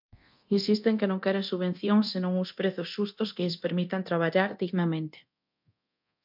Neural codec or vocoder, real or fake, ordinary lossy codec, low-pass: codec, 24 kHz, 0.9 kbps, DualCodec; fake; MP3, 48 kbps; 5.4 kHz